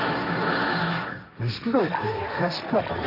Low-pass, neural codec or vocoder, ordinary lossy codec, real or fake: 5.4 kHz; codec, 16 kHz, 1.1 kbps, Voila-Tokenizer; none; fake